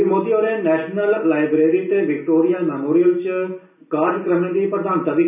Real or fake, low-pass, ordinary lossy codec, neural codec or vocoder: real; 3.6 kHz; none; none